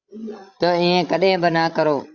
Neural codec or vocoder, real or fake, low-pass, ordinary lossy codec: codec, 16 kHz, 16 kbps, FreqCodec, larger model; fake; 7.2 kHz; Opus, 64 kbps